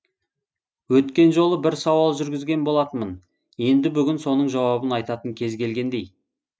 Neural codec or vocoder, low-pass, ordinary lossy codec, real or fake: none; none; none; real